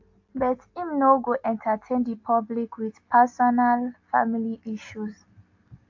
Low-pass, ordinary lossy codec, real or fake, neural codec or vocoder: 7.2 kHz; AAC, 48 kbps; real; none